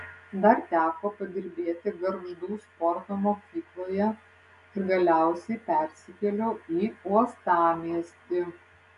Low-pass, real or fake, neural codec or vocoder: 10.8 kHz; real; none